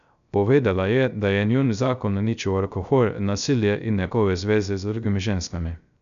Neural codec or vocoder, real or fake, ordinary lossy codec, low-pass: codec, 16 kHz, 0.3 kbps, FocalCodec; fake; none; 7.2 kHz